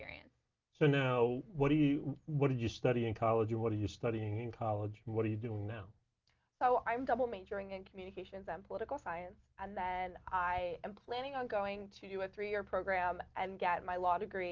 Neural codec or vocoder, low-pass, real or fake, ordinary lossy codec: none; 7.2 kHz; real; Opus, 24 kbps